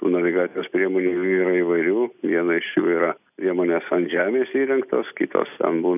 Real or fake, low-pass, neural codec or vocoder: real; 3.6 kHz; none